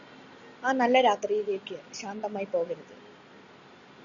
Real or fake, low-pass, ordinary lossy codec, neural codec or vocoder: real; 7.2 kHz; Opus, 64 kbps; none